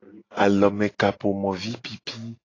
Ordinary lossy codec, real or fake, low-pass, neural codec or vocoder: AAC, 32 kbps; real; 7.2 kHz; none